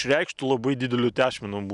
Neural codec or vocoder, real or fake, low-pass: none; real; 10.8 kHz